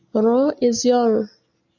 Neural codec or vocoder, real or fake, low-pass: none; real; 7.2 kHz